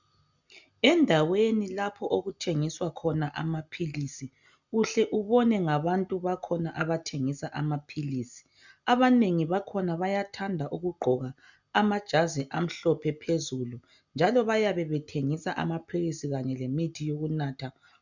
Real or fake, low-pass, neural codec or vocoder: real; 7.2 kHz; none